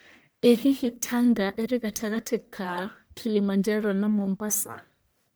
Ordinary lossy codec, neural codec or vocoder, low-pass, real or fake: none; codec, 44.1 kHz, 1.7 kbps, Pupu-Codec; none; fake